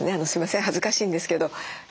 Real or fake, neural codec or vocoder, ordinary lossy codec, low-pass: real; none; none; none